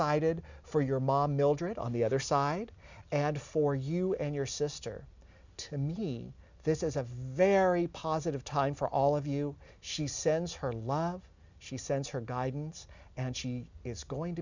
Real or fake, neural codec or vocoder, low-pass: real; none; 7.2 kHz